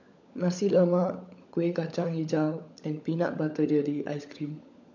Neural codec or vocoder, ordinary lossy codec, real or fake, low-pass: codec, 16 kHz, 16 kbps, FunCodec, trained on LibriTTS, 50 frames a second; none; fake; 7.2 kHz